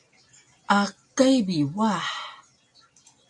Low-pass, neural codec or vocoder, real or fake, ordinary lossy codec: 10.8 kHz; none; real; AAC, 64 kbps